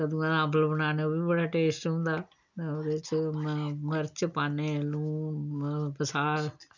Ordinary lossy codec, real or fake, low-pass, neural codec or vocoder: none; real; 7.2 kHz; none